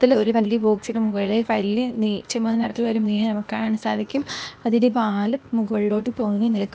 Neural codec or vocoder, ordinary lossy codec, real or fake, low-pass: codec, 16 kHz, 0.8 kbps, ZipCodec; none; fake; none